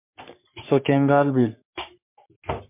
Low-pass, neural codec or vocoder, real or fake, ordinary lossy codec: 3.6 kHz; codec, 44.1 kHz, 3.4 kbps, Pupu-Codec; fake; MP3, 32 kbps